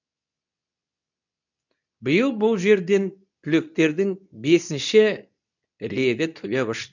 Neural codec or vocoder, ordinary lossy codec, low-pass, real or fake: codec, 24 kHz, 0.9 kbps, WavTokenizer, medium speech release version 2; none; 7.2 kHz; fake